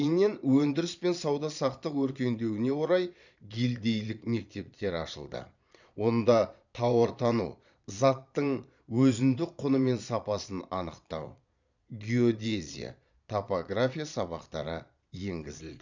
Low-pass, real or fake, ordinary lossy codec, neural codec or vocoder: 7.2 kHz; fake; none; vocoder, 22.05 kHz, 80 mel bands, Vocos